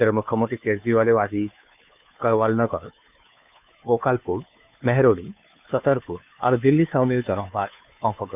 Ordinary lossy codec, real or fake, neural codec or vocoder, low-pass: none; fake; codec, 16 kHz, 2 kbps, FunCodec, trained on Chinese and English, 25 frames a second; 3.6 kHz